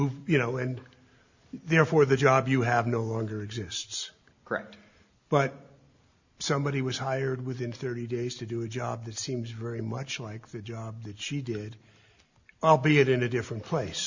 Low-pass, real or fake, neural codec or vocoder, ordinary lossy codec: 7.2 kHz; real; none; Opus, 64 kbps